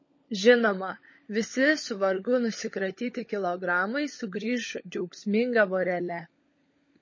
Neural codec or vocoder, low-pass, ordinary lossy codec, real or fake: codec, 16 kHz, 16 kbps, FunCodec, trained on LibriTTS, 50 frames a second; 7.2 kHz; MP3, 32 kbps; fake